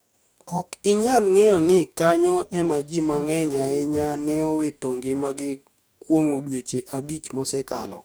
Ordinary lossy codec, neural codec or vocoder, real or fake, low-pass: none; codec, 44.1 kHz, 2.6 kbps, DAC; fake; none